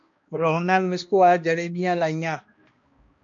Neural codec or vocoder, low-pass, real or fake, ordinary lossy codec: codec, 16 kHz, 1 kbps, X-Codec, HuBERT features, trained on balanced general audio; 7.2 kHz; fake; MP3, 48 kbps